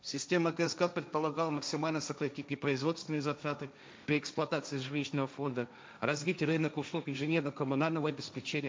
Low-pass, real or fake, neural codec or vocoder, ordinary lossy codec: none; fake; codec, 16 kHz, 1.1 kbps, Voila-Tokenizer; none